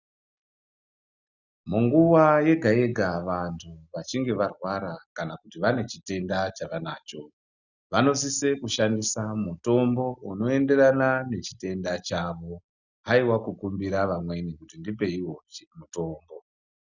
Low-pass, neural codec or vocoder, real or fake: 7.2 kHz; none; real